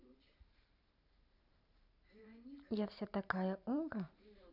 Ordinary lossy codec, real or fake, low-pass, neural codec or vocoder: none; fake; 5.4 kHz; vocoder, 44.1 kHz, 128 mel bands every 512 samples, BigVGAN v2